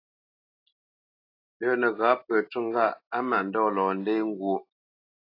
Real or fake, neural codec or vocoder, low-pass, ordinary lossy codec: real; none; 5.4 kHz; AAC, 32 kbps